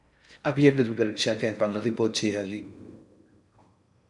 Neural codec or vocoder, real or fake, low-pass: codec, 16 kHz in and 24 kHz out, 0.6 kbps, FocalCodec, streaming, 4096 codes; fake; 10.8 kHz